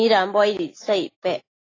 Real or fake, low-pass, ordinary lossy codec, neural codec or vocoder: real; 7.2 kHz; AAC, 32 kbps; none